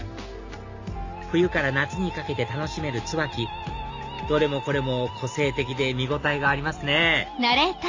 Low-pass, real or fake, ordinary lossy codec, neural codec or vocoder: 7.2 kHz; real; AAC, 48 kbps; none